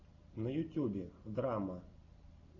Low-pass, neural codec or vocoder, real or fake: 7.2 kHz; none; real